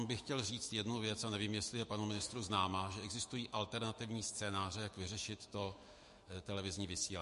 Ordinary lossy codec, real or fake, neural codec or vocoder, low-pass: MP3, 48 kbps; fake; autoencoder, 48 kHz, 128 numbers a frame, DAC-VAE, trained on Japanese speech; 14.4 kHz